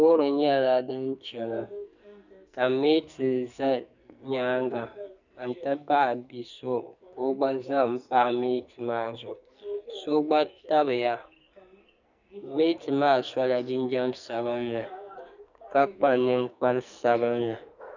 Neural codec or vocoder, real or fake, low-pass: codec, 44.1 kHz, 2.6 kbps, SNAC; fake; 7.2 kHz